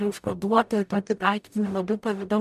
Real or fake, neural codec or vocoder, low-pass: fake; codec, 44.1 kHz, 0.9 kbps, DAC; 14.4 kHz